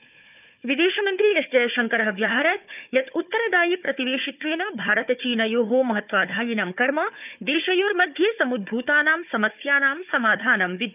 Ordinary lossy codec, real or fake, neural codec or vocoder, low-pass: none; fake; codec, 16 kHz, 4 kbps, FunCodec, trained on Chinese and English, 50 frames a second; 3.6 kHz